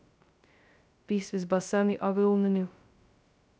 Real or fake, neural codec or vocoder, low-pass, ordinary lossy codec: fake; codec, 16 kHz, 0.2 kbps, FocalCodec; none; none